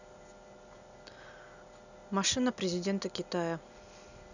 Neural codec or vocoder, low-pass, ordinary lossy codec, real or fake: none; 7.2 kHz; none; real